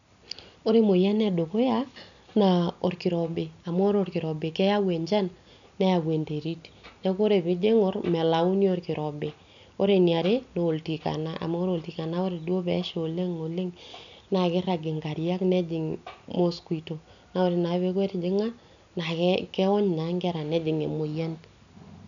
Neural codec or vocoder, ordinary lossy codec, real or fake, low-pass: none; none; real; 7.2 kHz